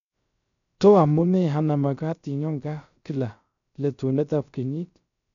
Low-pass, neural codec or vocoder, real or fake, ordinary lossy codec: 7.2 kHz; codec, 16 kHz, 0.3 kbps, FocalCodec; fake; none